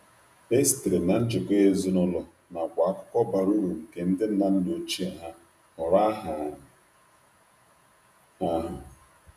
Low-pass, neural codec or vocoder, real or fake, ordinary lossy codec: 14.4 kHz; vocoder, 48 kHz, 128 mel bands, Vocos; fake; none